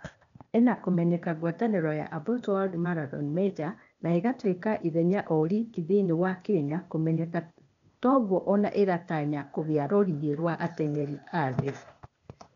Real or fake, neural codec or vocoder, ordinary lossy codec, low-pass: fake; codec, 16 kHz, 0.8 kbps, ZipCodec; none; 7.2 kHz